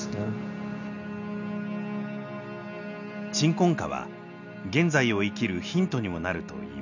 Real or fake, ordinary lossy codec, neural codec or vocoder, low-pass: real; none; none; 7.2 kHz